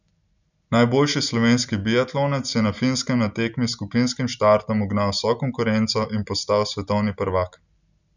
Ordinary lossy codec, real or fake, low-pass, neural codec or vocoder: none; real; 7.2 kHz; none